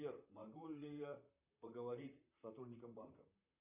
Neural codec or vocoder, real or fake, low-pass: vocoder, 44.1 kHz, 128 mel bands, Pupu-Vocoder; fake; 3.6 kHz